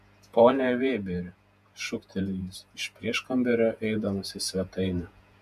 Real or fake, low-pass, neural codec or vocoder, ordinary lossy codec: fake; 14.4 kHz; vocoder, 48 kHz, 128 mel bands, Vocos; AAC, 96 kbps